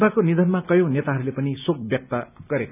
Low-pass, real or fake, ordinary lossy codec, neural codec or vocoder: 3.6 kHz; real; none; none